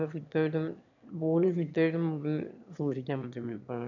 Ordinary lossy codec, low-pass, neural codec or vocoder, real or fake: none; 7.2 kHz; autoencoder, 22.05 kHz, a latent of 192 numbers a frame, VITS, trained on one speaker; fake